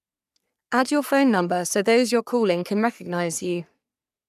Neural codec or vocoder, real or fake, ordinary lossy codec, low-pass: codec, 44.1 kHz, 3.4 kbps, Pupu-Codec; fake; none; 14.4 kHz